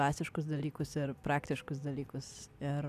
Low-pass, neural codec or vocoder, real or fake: 14.4 kHz; none; real